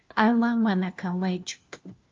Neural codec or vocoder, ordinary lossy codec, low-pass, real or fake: codec, 16 kHz, 1 kbps, FunCodec, trained on LibriTTS, 50 frames a second; Opus, 32 kbps; 7.2 kHz; fake